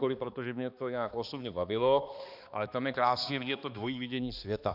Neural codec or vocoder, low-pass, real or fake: codec, 16 kHz, 2 kbps, X-Codec, HuBERT features, trained on balanced general audio; 5.4 kHz; fake